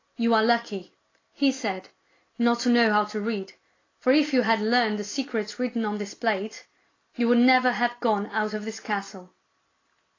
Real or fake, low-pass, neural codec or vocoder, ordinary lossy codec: real; 7.2 kHz; none; AAC, 32 kbps